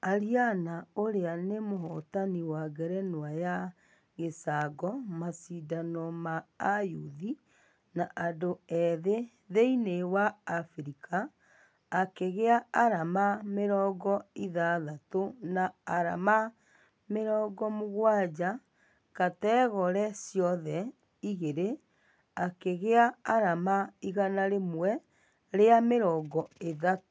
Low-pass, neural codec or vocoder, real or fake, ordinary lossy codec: none; none; real; none